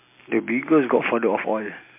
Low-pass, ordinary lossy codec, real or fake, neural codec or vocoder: 3.6 kHz; MP3, 24 kbps; real; none